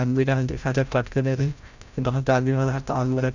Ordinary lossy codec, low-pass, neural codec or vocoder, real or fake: none; 7.2 kHz; codec, 16 kHz, 0.5 kbps, FreqCodec, larger model; fake